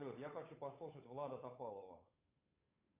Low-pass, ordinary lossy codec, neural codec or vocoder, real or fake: 3.6 kHz; AAC, 24 kbps; codec, 16 kHz, 8 kbps, FunCodec, trained on Chinese and English, 25 frames a second; fake